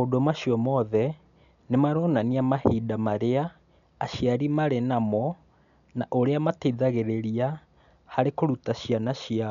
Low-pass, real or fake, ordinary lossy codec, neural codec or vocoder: 7.2 kHz; real; none; none